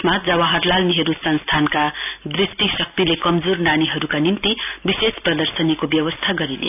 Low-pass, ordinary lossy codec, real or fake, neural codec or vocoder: 3.6 kHz; none; real; none